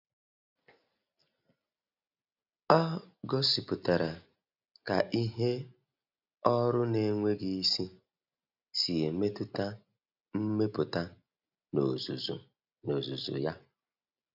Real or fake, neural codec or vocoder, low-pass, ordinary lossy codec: real; none; 5.4 kHz; none